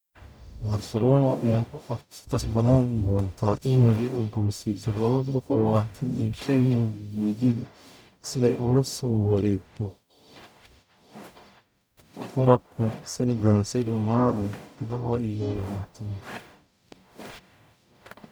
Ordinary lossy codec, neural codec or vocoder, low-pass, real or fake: none; codec, 44.1 kHz, 0.9 kbps, DAC; none; fake